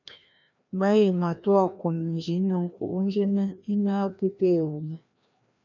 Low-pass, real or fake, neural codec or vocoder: 7.2 kHz; fake; codec, 16 kHz, 1 kbps, FreqCodec, larger model